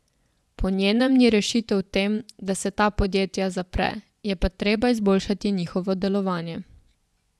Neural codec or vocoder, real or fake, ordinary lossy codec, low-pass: vocoder, 24 kHz, 100 mel bands, Vocos; fake; none; none